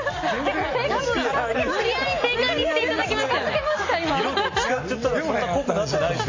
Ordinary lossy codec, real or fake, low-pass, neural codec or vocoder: MP3, 32 kbps; real; 7.2 kHz; none